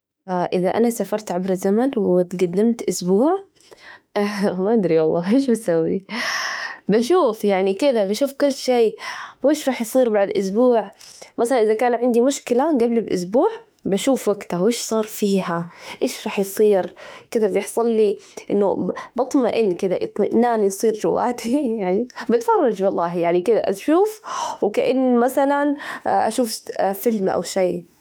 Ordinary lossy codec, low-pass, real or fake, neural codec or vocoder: none; none; fake; autoencoder, 48 kHz, 32 numbers a frame, DAC-VAE, trained on Japanese speech